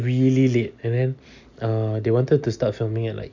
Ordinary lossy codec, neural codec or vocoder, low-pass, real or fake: none; none; 7.2 kHz; real